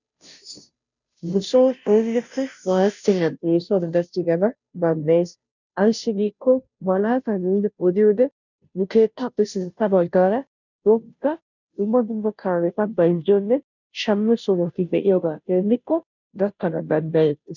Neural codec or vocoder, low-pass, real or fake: codec, 16 kHz, 0.5 kbps, FunCodec, trained on Chinese and English, 25 frames a second; 7.2 kHz; fake